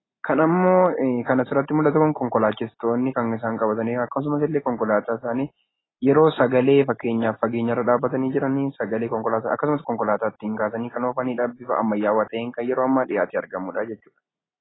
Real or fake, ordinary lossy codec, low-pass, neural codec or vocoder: real; AAC, 16 kbps; 7.2 kHz; none